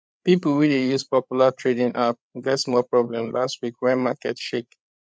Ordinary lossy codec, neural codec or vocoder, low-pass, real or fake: none; codec, 16 kHz, 8 kbps, FreqCodec, larger model; none; fake